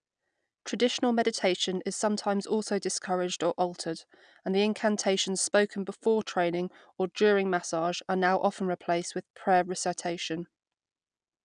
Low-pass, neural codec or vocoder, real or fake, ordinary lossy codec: 9.9 kHz; none; real; none